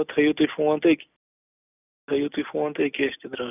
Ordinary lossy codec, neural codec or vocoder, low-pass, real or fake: none; none; 3.6 kHz; real